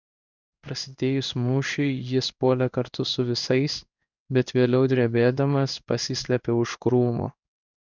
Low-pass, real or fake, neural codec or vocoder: 7.2 kHz; fake; codec, 16 kHz in and 24 kHz out, 1 kbps, XY-Tokenizer